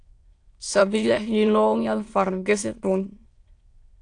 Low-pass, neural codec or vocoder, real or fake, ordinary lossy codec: 9.9 kHz; autoencoder, 22.05 kHz, a latent of 192 numbers a frame, VITS, trained on many speakers; fake; AAC, 48 kbps